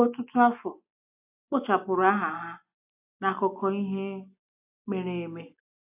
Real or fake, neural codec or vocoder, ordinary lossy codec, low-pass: real; none; AAC, 32 kbps; 3.6 kHz